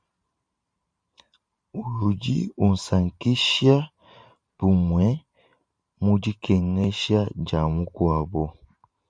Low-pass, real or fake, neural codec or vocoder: 9.9 kHz; fake; vocoder, 44.1 kHz, 128 mel bands every 512 samples, BigVGAN v2